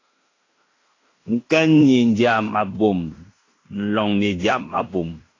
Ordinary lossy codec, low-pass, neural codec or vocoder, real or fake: AAC, 32 kbps; 7.2 kHz; codec, 16 kHz in and 24 kHz out, 0.9 kbps, LongCat-Audio-Codec, fine tuned four codebook decoder; fake